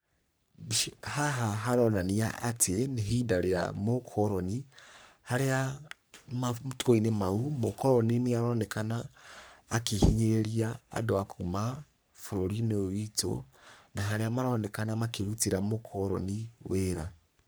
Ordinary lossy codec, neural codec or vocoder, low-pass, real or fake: none; codec, 44.1 kHz, 3.4 kbps, Pupu-Codec; none; fake